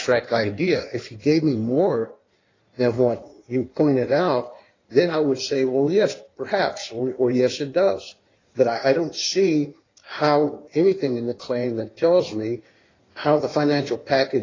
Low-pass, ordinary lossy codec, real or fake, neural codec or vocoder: 7.2 kHz; AAC, 32 kbps; fake; codec, 16 kHz in and 24 kHz out, 1.1 kbps, FireRedTTS-2 codec